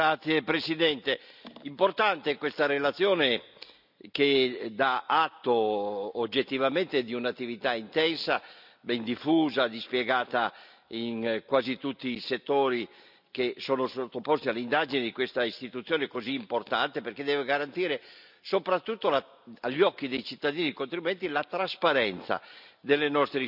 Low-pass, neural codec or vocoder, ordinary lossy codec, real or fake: 5.4 kHz; none; none; real